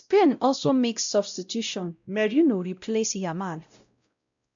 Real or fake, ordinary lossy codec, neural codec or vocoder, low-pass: fake; MP3, 64 kbps; codec, 16 kHz, 0.5 kbps, X-Codec, WavLM features, trained on Multilingual LibriSpeech; 7.2 kHz